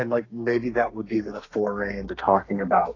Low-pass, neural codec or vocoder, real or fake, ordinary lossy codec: 7.2 kHz; codec, 32 kHz, 1.9 kbps, SNAC; fake; AAC, 32 kbps